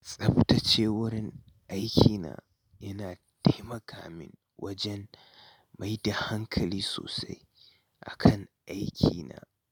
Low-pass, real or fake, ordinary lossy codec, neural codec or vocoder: none; real; none; none